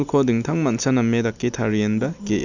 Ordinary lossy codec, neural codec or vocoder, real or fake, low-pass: none; none; real; 7.2 kHz